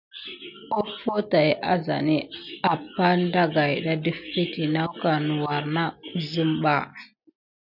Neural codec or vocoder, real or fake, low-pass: none; real; 5.4 kHz